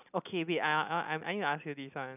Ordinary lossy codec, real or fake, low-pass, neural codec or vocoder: none; real; 3.6 kHz; none